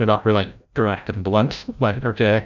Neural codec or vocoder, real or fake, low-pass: codec, 16 kHz, 0.5 kbps, FreqCodec, larger model; fake; 7.2 kHz